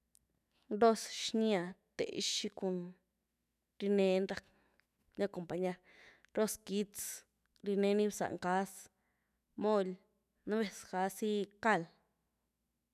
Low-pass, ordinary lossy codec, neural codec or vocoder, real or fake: 14.4 kHz; none; autoencoder, 48 kHz, 128 numbers a frame, DAC-VAE, trained on Japanese speech; fake